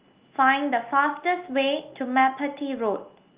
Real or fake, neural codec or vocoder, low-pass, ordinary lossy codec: real; none; 3.6 kHz; Opus, 32 kbps